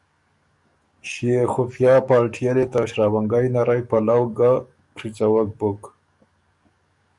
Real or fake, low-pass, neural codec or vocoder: fake; 10.8 kHz; codec, 44.1 kHz, 7.8 kbps, DAC